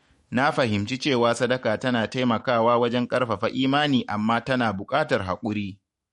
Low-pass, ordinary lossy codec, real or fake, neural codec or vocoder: 19.8 kHz; MP3, 48 kbps; fake; autoencoder, 48 kHz, 128 numbers a frame, DAC-VAE, trained on Japanese speech